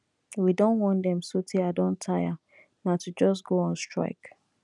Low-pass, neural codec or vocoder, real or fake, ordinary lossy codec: 10.8 kHz; none; real; none